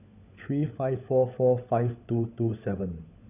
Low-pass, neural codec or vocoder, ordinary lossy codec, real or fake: 3.6 kHz; codec, 16 kHz, 16 kbps, FunCodec, trained on LibriTTS, 50 frames a second; none; fake